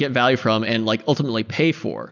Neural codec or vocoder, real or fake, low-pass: none; real; 7.2 kHz